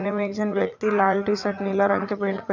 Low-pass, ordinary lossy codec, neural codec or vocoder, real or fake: 7.2 kHz; none; vocoder, 44.1 kHz, 80 mel bands, Vocos; fake